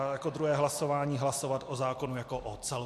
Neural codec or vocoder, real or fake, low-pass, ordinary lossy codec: none; real; 14.4 kHz; MP3, 64 kbps